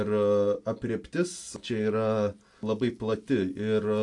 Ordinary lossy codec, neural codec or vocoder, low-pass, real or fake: AAC, 64 kbps; none; 10.8 kHz; real